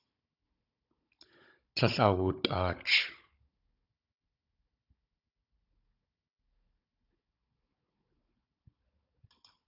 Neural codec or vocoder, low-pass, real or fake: codec, 16 kHz, 16 kbps, FunCodec, trained on Chinese and English, 50 frames a second; 5.4 kHz; fake